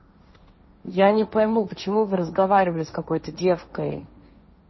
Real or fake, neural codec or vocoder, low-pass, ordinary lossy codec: fake; codec, 16 kHz, 1.1 kbps, Voila-Tokenizer; 7.2 kHz; MP3, 24 kbps